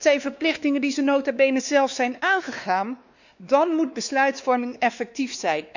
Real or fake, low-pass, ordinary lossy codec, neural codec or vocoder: fake; 7.2 kHz; none; codec, 16 kHz, 2 kbps, X-Codec, WavLM features, trained on Multilingual LibriSpeech